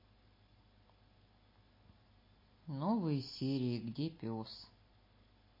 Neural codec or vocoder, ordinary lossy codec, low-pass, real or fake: none; MP3, 24 kbps; 5.4 kHz; real